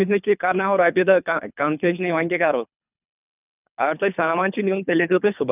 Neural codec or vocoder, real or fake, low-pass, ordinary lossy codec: codec, 24 kHz, 3 kbps, HILCodec; fake; 3.6 kHz; none